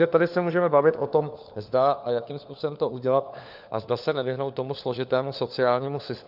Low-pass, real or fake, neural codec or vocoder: 5.4 kHz; fake; codec, 16 kHz, 4 kbps, FunCodec, trained on LibriTTS, 50 frames a second